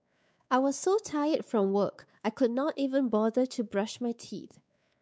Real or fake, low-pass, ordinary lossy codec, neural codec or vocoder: fake; none; none; codec, 16 kHz, 4 kbps, X-Codec, WavLM features, trained on Multilingual LibriSpeech